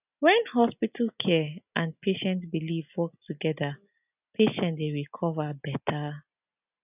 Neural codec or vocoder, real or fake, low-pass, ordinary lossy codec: none; real; 3.6 kHz; none